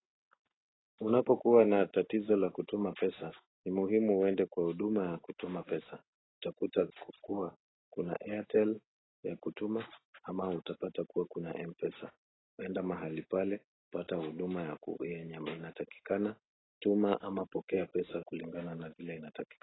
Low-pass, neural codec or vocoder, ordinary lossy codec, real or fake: 7.2 kHz; none; AAC, 16 kbps; real